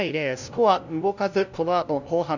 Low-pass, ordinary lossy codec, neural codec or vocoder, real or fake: 7.2 kHz; none; codec, 16 kHz, 0.5 kbps, FunCodec, trained on LibriTTS, 25 frames a second; fake